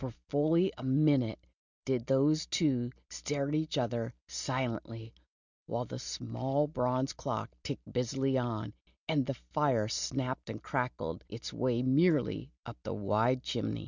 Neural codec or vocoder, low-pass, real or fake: none; 7.2 kHz; real